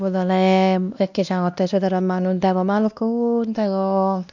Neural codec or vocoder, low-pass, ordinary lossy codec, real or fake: codec, 16 kHz, 1 kbps, X-Codec, WavLM features, trained on Multilingual LibriSpeech; 7.2 kHz; none; fake